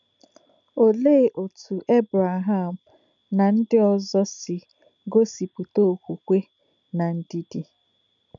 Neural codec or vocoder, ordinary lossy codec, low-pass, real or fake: none; none; 7.2 kHz; real